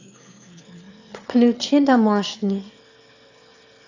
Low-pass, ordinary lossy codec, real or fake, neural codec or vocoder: 7.2 kHz; AAC, 48 kbps; fake; autoencoder, 22.05 kHz, a latent of 192 numbers a frame, VITS, trained on one speaker